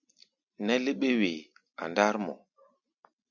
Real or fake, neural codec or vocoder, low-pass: real; none; 7.2 kHz